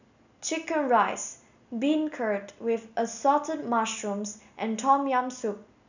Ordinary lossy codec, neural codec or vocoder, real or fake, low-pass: none; none; real; 7.2 kHz